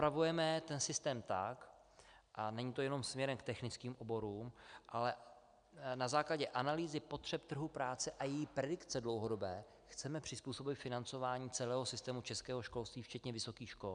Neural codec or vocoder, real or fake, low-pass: none; real; 9.9 kHz